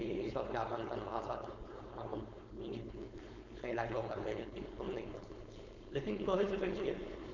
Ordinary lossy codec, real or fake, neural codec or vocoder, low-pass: none; fake; codec, 16 kHz, 4.8 kbps, FACodec; 7.2 kHz